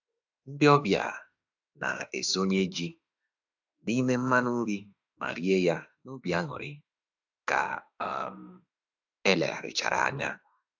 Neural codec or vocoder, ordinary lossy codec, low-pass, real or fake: autoencoder, 48 kHz, 32 numbers a frame, DAC-VAE, trained on Japanese speech; AAC, 48 kbps; 7.2 kHz; fake